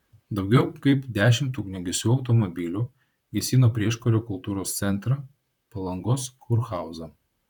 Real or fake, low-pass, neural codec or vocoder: fake; 19.8 kHz; vocoder, 44.1 kHz, 128 mel bands, Pupu-Vocoder